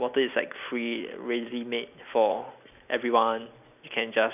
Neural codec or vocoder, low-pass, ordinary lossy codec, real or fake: none; 3.6 kHz; none; real